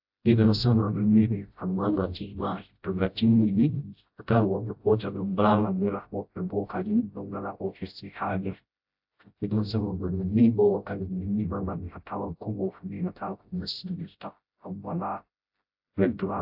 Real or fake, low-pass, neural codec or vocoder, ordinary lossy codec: fake; 5.4 kHz; codec, 16 kHz, 0.5 kbps, FreqCodec, smaller model; AAC, 48 kbps